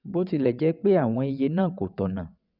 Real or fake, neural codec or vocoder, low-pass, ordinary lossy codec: fake; vocoder, 22.05 kHz, 80 mel bands, WaveNeXt; 5.4 kHz; none